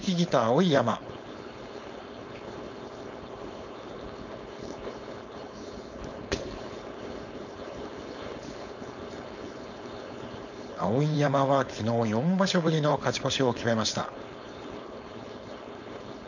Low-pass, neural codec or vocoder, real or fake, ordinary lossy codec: 7.2 kHz; codec, 16 kHz, 4.8 kbps, FACodec; fake; none